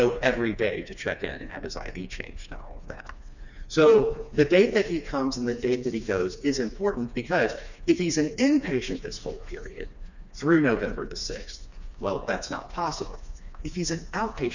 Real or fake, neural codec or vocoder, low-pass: fake; codec, 16 kHz, 2 kbps, FreqCodec, smaller model; 7.2 kHz